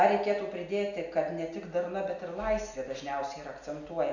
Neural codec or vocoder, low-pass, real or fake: none; 7.2 kHz; real